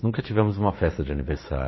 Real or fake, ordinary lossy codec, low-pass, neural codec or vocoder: fake; MP3, 24 kbps; 7.2 kHz; vocoder, 44.1 kHz, 80 mel bands, Vocos